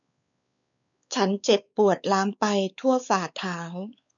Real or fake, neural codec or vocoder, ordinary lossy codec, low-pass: fake; codec, 16 kHz, 4 kbps, X-Codec, WavLM features, trained on Multilingual LibriSpeech; none; 7.2 kHz